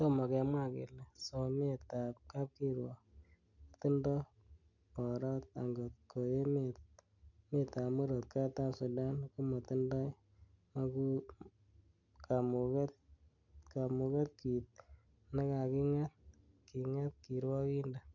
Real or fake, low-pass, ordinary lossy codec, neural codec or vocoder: real; 7.2 kHz; none; none